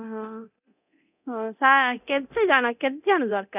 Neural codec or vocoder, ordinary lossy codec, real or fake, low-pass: codec, 24 kHz, 0.9 kbps, DualCodec; none; fake; 3.6 kHz